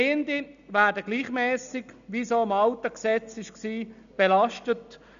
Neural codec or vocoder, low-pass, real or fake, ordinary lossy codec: none; 7.2 kHz; real; none